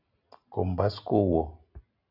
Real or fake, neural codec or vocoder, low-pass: real; none; 5.4 kHz